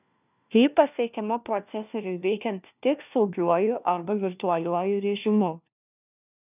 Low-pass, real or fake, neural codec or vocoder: 3.6 kHz; fake; codec, 16 kHz, 1 kbps, FunCodec, trained on LibriTTS, 50 frames a second